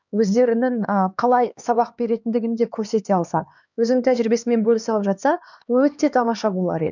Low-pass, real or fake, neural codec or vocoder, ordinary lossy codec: 7.2 kHz; fake; codec, 16 kHz, 2 kbps, X-Codec, HuBERT features, trained on LibriSpeech; none